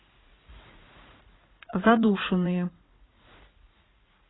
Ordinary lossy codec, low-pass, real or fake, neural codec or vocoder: AAC, 16 kbps; 7.2 kHz; fake; vocoder, 44.1 kHz, 80 mel bands, Vocos